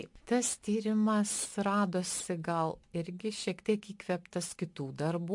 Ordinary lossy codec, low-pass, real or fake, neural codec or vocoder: MP3, 64 kbps; 10.8 kHz; real; none